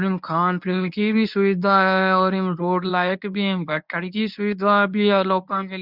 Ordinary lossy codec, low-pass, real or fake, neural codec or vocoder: none; 5.4 kHz; fake; codec, 24 kHz, 0.9 kbps, WavTokenizer, medium speech release version 1